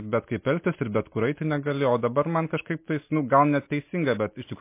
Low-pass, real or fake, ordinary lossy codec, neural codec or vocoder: 3.6 kHz; real; MP3, 24 kbps; none